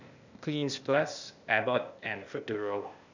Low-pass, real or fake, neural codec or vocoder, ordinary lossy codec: 7.2 kHz; fake; codec, 16 kHz, 0.8 kbps, ZipCodec; none